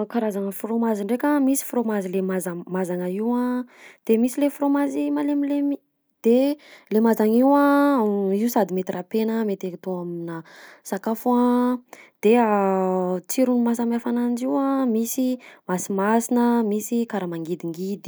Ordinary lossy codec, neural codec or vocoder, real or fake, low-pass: none; none; real; none